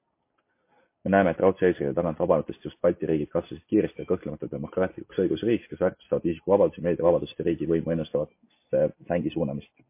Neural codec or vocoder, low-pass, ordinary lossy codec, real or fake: none; 3.6 kHz; MP3, 24 kbps; real